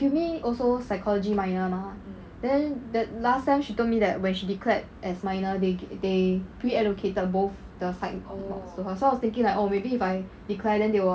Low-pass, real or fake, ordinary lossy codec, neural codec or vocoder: none; real; none; none